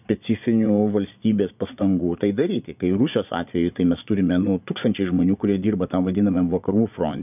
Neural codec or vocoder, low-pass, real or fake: vocoder, 22.05 kHz, 80 mel bands, Vocos; 3.6 kHz; fake